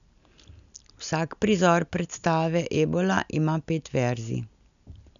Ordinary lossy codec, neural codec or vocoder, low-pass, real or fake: none; none; 7.2 kHz; real